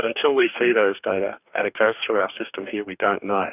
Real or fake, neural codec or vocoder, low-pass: fake; codec, 44.1 kHz, 2.6 kbps, DAC; 3.6 kHz